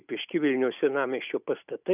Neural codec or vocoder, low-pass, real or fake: none; 3.6 kHz; real